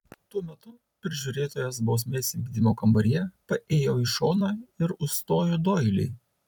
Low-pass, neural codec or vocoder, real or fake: 19.8 kHz; vocoder, 44.1 kHz, 128 mel bands every 256 samples, BigVGAN v2; fake